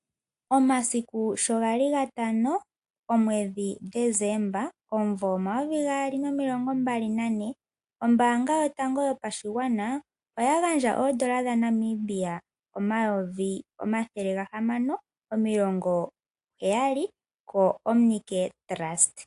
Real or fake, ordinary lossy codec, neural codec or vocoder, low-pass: real; AAC, 64 kbps; none; 10.8 kHz